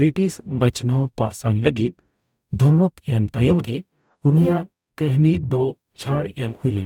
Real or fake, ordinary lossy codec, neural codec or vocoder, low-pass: fake; none; codec, 44.1 kHz, 0.9 kbps, DAC; 19.8 kHz